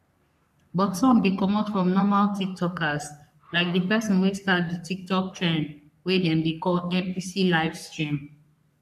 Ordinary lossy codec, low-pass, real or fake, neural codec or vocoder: none; 14.4 kHz; fake; codec, 44.1 kHz, 3.4 kbps, Pupu-Codec